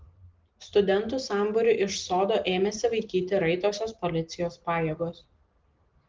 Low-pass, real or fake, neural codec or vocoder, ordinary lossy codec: 7.2 kHz; real; none; Opus, 16 kbps